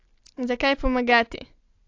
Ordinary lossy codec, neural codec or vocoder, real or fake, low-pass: AAC, 48 kbps; none; real; 7.2 kHz